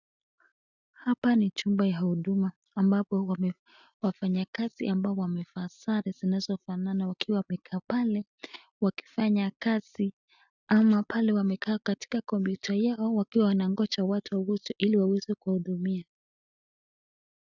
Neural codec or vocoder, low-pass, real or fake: none; 7.2 kHz; real